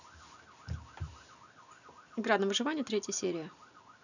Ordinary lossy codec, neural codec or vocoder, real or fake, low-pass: none; none; real; 7.2 kHz